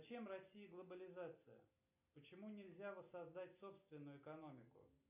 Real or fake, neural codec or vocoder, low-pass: real; none; 3.6 kHz